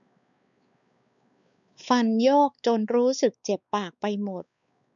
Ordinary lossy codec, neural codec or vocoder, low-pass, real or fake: none; codec, 16 kHz, 4 kbps, X-Codec, WavLM features, trained on Multilingual LibriSpeech; 7.2 kHz; fake